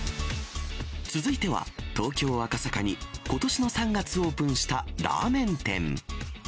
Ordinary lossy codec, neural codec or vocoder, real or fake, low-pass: none; none; real; none